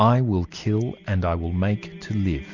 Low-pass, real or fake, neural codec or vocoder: 7.2 kHz; real; none